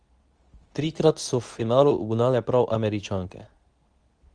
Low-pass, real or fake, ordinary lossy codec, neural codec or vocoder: 9.9 kHz; fake; Opus, 24 kbps; codec, 24 kHz, 0.9 kbps, WavTokenizer, medium speech release version 2